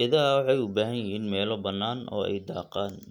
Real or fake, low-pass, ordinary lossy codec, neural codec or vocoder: real; 19.8 kHz; none; none